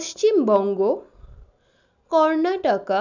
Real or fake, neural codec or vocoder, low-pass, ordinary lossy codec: real; none; 7.2 kHz; none